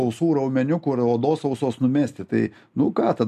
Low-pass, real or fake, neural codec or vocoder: 14.4 kHz; real; none